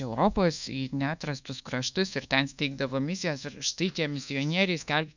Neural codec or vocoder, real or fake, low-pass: codec, 24 kHz, 1.2 kbps, DualCodec; fake; 7.2 kHz